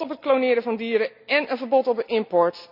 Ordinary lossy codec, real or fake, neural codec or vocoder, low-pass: none; real; none; 5.4 kHz